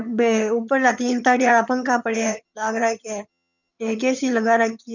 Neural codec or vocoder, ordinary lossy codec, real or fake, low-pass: vocoder, 22.05 kHz, 80 mel bands, HiFi-GAN; none; fake; 7.2 kHz